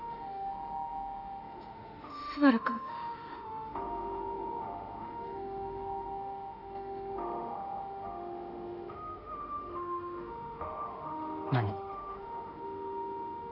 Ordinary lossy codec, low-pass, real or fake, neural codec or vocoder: none; 5.4 kHz; fake; autoencoder, 48 kHz, 32 numbers a frame, DAC-VAE, trained on Japanese speech